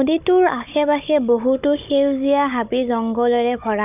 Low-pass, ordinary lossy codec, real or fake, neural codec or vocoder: 3.6 kHz; none; fake; codec, 16 kHz, 16 kbps, FunCodec, trained on Chinese and English, 50 frames a second